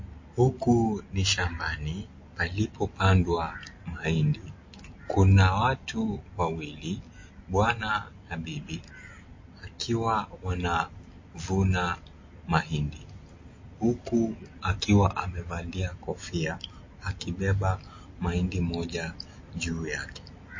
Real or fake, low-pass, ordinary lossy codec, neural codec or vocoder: real; 7.2 kHz; MP3, 32 kbps; none